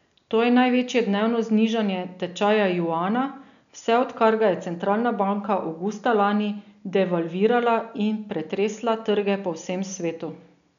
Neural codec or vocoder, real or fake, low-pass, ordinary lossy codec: none; real; 7.2 kHz; none